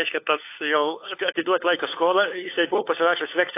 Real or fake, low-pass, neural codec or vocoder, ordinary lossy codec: fake; 3.6 kHz; codec, 16 kHz, 4 kbps, FunCodec, trained on LibriTTS, 50 frames a second; AAC, 24 kbps